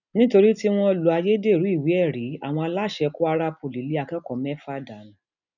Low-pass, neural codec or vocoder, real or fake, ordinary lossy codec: 7.2 kHz; none; real; none